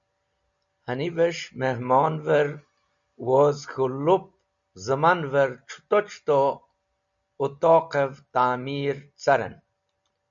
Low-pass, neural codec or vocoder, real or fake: 7.2 kHz; none; real